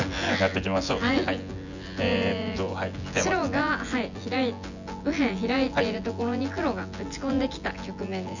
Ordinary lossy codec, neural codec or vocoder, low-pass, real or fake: none; vocoder, 24 kHz, 100 mel bands, Vocos; 7.2 kHz; fake